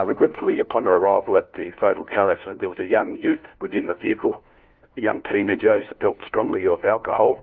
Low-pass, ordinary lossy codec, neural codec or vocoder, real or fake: 7.2 kHz; Opus, 24 kbps; codec, 16 kHz, 1 kbps, FunCodec, trained on LibriTTS, 50 frames a second; fake